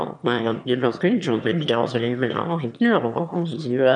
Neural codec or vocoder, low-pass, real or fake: autoencoder, 22.05 kHz, a latent of 192 numbers a frame, VITS, trained on one speaker; 9.9 kHz; fake